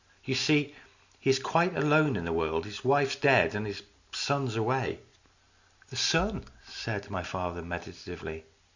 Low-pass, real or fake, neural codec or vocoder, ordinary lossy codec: 7.2 kHz; real; none; Opus, 64 kbps